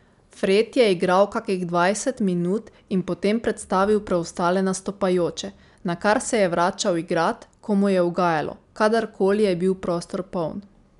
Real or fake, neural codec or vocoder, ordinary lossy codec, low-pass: real; none; none; 10.8 kHz